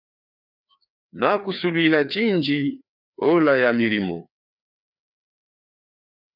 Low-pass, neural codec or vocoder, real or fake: 5.4 kHz; codec, 16 kHz, 2 kbps, FreqCodec, larger model; fake